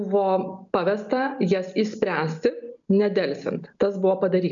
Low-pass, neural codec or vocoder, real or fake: 7.2 kHz; none; real